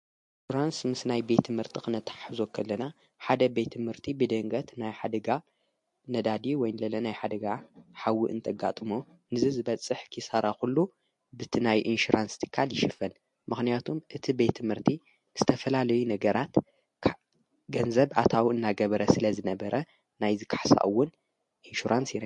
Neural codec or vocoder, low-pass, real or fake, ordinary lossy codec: none; 10.8 kHz; real; MP3, 48 kbps